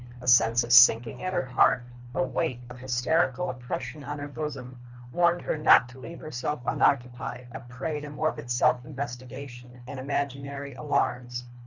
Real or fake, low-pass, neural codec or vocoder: fake; 7.2 kHz; codec, 24 kHz, 3 kbps, HILCodec